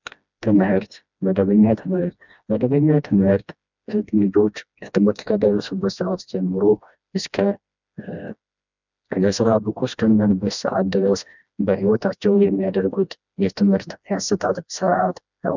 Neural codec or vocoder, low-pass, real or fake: codec, 16 kHz, 1 kbps, FreqCodec, smaller model; 7.2 kHz; fake